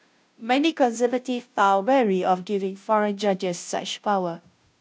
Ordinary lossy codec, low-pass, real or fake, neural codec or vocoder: none; none; fake; codec, 16 kHz, 0.5 kbps, FunCodec, trained on Chinese and English, 25 frames a second